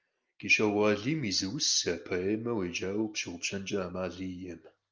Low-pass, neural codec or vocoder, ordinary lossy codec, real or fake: 7.2 kHz; none; Opus, 24 kbps; real